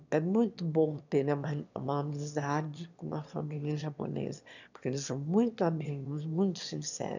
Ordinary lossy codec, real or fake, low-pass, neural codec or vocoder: none; fake; 7.2 kHz; autoencoder, 22.05 kHz, a latent of 192 numbers a frame, VITS, trained on one speaker